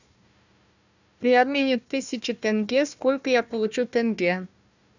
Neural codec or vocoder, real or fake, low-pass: codec, 16 kHz, 1 kbps, FunCodec, trained on Chinese and English, 50 frames a second; fake; 7.2 kHz